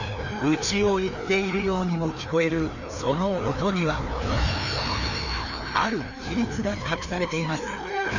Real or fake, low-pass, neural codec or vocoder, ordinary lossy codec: fake; 7.2 kHz; codec, 16 kHz, 2 kbps, FreqCodec, larger model; none